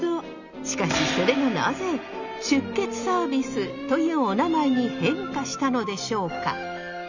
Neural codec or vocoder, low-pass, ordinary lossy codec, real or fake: none; 7.2 kHz; none; real